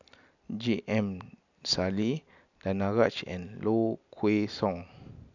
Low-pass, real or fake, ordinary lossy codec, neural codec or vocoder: 7.2 kHz; real; none; none